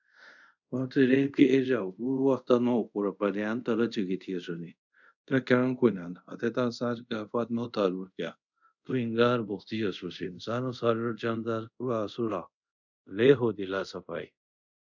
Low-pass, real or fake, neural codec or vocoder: 7.2 kHz; fake; codec, 24 kHz, 0.5 kbps, DualCodec